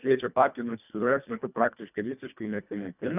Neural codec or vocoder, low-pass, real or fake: codec, 24 kHz, 1.5 kbps, HILCodec; 3.6 kHz; fake